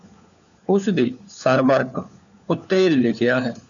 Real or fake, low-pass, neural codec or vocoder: fake; 7.2 kHz; codec, 16 kHz, 4 kbps, FunCodec, trained on LibriTTS, 50 frames a second